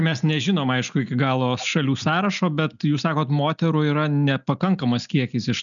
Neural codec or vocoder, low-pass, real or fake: none; 7.2 kHz; real